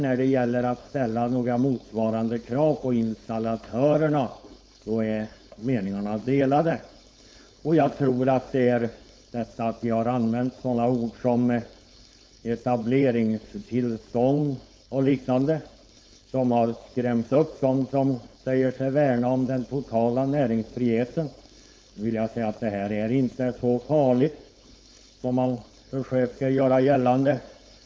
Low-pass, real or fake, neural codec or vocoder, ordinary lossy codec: none; fake; codec, 16 kHz, 4.8 kbps, FACodec; none